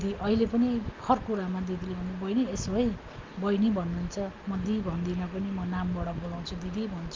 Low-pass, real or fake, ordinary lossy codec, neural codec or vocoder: 7.2 kHz; real; Opus, 32 kbps; none